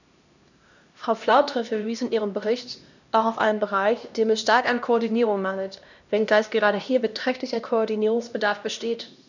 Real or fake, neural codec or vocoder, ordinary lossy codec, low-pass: fake; codec, 16 kHz, 1 kbps, X-Codec, HuBERT features, trained on LibriSpeech; none; 7.2 kHz